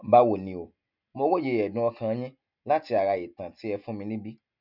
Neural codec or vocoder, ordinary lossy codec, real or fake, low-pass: none; none; real; 5.4 kHz